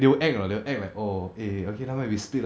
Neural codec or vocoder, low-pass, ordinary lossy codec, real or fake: none; none; none; real